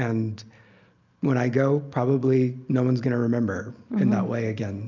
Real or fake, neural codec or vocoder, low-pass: real; none; 7.2 kHz